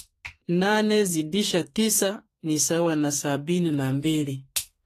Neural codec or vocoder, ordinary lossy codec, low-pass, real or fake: codec, 32 kHz, 1.9 kbps, SNAC; AAC, 48 kbps; 14.4 kHz; fake